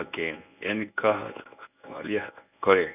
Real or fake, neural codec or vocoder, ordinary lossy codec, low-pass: fake; codec, 24 kHz, 0.9 kbps, WavTokenizer, medium speech release version 1; none; 3.6 kHz